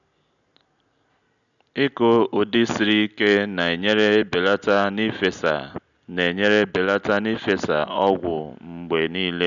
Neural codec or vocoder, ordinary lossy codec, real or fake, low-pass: none; none; real; 7.2 kHz